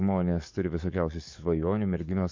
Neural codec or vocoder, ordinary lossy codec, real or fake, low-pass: codec, 44.1 kHz, 7.8 kbps, DAC; MP3, 48 kbps; fake; 7.2 kHz